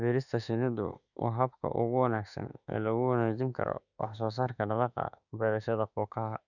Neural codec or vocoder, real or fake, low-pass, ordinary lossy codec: autoencoder, 48 kHz, 32 numbers a frame, DAC-VAE, trained on Japanese speech; fake; 7.2 kHz; none